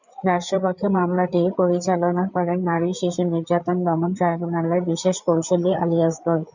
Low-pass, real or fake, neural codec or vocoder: 7.2 kHz; fake; codec, 16 kHz, 8 kbps, FreqCodec, larger model